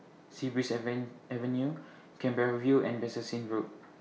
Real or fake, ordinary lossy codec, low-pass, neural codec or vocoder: real; none; none; none